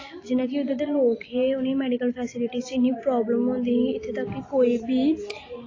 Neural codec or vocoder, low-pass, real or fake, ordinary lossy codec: none; 7.2 kHz; real; AAC, 32 kbps